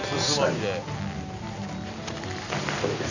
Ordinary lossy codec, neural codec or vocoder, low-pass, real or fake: none; none; 7.2 kHz; real